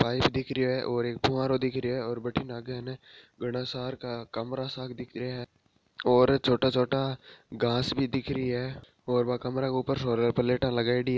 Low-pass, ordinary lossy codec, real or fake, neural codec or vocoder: none; none; real; none